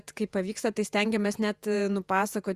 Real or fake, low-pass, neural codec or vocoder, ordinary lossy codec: fake; 14.4 kHz; vocoder, 48 kHz, 128 mel bands, Vocos; Opus, 64 kbps